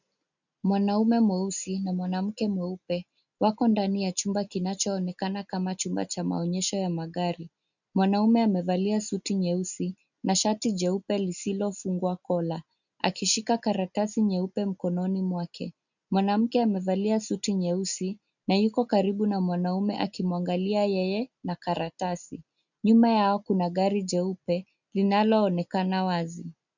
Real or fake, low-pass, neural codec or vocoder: real; 7.2 kHz; none